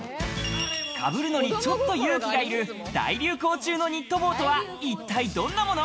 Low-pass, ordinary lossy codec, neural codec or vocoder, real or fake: none; none; none; real